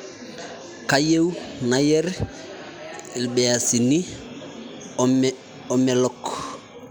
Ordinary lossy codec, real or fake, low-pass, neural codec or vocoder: none; real; none; none